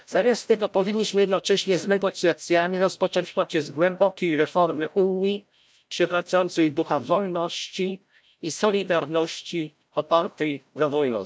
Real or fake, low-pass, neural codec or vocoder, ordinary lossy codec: fake; none; codec, 16 kHz, 0.5 kbps, FreqCodec, larger model; none